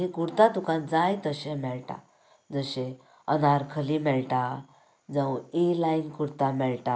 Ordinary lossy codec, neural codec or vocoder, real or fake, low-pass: none; none; real; none